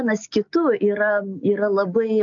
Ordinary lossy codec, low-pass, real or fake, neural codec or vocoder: AAC, 64 kbps; 7.2 kHz; real; none